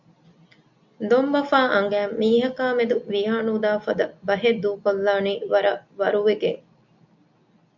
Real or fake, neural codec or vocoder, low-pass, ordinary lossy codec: real; none; 7.2 kHz; MP3, 64 kbps